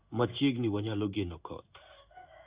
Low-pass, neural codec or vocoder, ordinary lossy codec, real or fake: 3.6 kHz; codec, 16 kHz in and 24 kHz out, 1 kbps, XY-Tokenizer; Opus, 64 kbps; fake